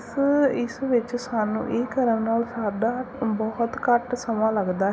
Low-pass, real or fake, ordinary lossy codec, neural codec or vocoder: none; real; none; none